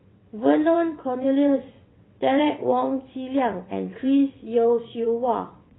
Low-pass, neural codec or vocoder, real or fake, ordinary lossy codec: 7.2 kHz; vocoder, 22.05 kHz, 80 mel bands, WaveNeXt; fake; AAC, 16 kbps